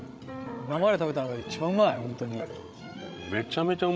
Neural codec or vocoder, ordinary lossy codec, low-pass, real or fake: codec, 16 kHz, 16 kbps, FreqCodec, larger model; none; none; fake